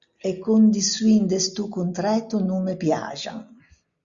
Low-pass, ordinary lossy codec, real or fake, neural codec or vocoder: 7.2 kHz; Opus, 64 kbps; real; none